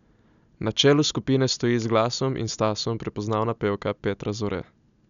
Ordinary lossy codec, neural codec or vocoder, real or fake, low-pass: none; none; real; 7.2 kHz